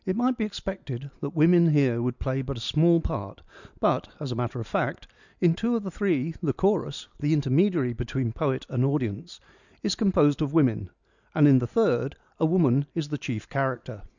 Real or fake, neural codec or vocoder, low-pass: real; none; 7.2 kHz